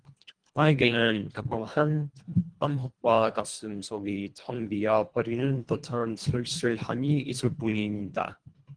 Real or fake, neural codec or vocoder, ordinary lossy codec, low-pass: fake; codec, 24 kHz, 1.5 kbps, HILCodec; Opus, 24 kbps; 9.9 kHz